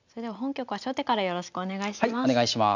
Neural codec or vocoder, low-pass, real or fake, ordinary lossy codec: none; 7.2 kHz; real; none